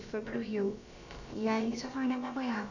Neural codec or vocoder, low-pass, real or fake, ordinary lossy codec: codec, 16 kHz, about 1 kbps, DyCAST, with the encoder's durations; 7.2 kHz; fake; none